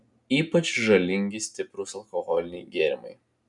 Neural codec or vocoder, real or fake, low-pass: none; real; 10.8 kHz